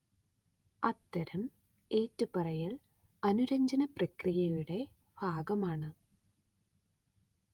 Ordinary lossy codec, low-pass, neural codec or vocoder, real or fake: Opus, 32 kbps; 19.8 kHz; vocoder, 48 kHz, 128 mel bands, Vocos; fake